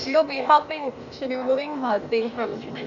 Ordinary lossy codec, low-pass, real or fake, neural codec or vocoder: none; 7.2 kHz; fake; codec, 16 kHz, 0.8 kbps, ZipCodec